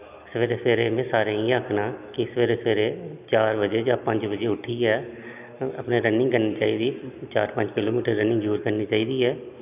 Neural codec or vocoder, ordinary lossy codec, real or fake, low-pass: none; none; real; 3.6 kHz